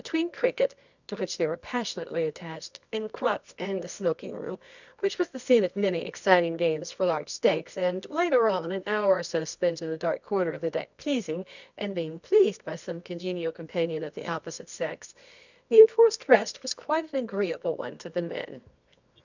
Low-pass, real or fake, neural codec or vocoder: 7.2 kHz; fake; codec, 24 kHz, 0.9 kbps, WavTokenizer, medium music audio release